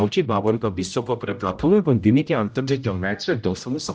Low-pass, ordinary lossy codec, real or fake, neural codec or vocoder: none; none; fake; codec, 16 kHz, 0.5 kbps, X-Codec, HuBERT features, trained on general audio